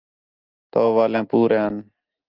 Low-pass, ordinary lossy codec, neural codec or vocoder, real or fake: 5.4 kHz; Opus, 32 kbps; none; real